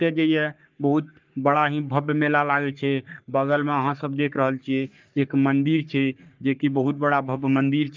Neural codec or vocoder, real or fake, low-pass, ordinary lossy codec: codec, 44.1 kHz, 3.4 kbps, Pupu-Codec; fake; 7.2 kHz; Opus, 32 kbps